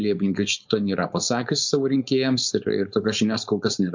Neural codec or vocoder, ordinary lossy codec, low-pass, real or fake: codec, 16 kHz, 4.8 kbps, FACodec; MP3, 64 kbps; 7.2 kHz; fake